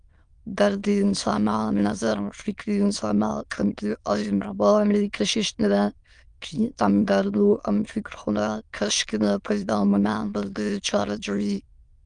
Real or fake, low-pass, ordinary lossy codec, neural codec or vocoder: fake; 9.9 kHz; Opus, 32 kbps; autoencoder, 22.05 kHz, a latent of 192 numbers a frame, VITS, trained on many speakers